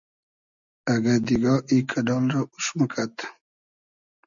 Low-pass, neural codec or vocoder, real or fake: 7.2 kHz; none; real